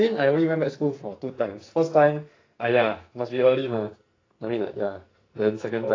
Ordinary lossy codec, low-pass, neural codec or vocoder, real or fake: AAC, 32 kbps; 7.2 kHz; codec, 44.1 kHz, 2.6 kbps, SNAC; fake